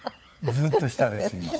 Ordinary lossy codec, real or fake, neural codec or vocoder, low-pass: none; fake; codec, 16 kHz, 4 kbps, FunCodec, trained on LibriTTS, 50 frames a second; none